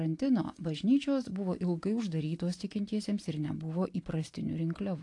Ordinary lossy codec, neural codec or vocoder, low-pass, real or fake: AAC, 48 kbps; none; 10.8 kHz; real